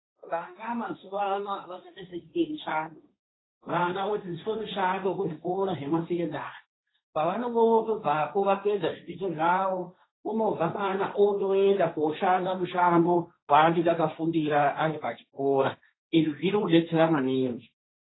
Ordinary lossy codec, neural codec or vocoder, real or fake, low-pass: AAC, 16 kbps; codec, 16 kHz, 1.1 kbps, Voila-Tokenizer; fake; 7.2 kHz